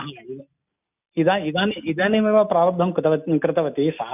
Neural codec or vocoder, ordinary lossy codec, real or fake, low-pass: none; none; real; 3.6 kHz